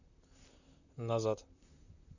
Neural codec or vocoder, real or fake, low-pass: vocoder, 44.1 kHz, 128 mel bands, Pupu-Vocoder; fake; 7.2 kHz